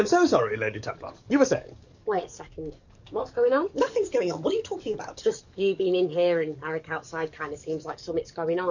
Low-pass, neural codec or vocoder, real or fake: 7.2 kHz; codec, 44.1 kHz, 7.8 kbps, DAC; fake